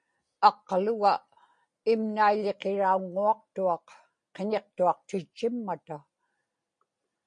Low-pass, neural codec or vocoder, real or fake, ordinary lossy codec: 9.9 kHz; none; real; MP3, 48 kbps